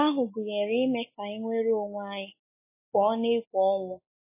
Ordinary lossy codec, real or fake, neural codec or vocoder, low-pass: MP3, 16 kbps; real; none; 3.6 kHz